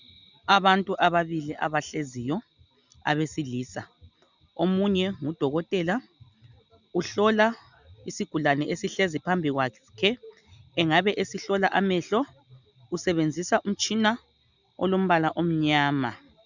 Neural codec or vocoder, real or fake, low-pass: none; real; 7.2 kHz